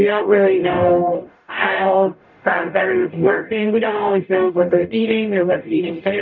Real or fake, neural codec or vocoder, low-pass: fake; codec, 44.1 kHz, 0.9 kbps, DAC; 7.2 kHz